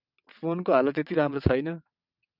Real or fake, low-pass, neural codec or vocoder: fake; 5.4 kHz; vocoder, 22.05 kHz, 80 mel bands, WaveNeXt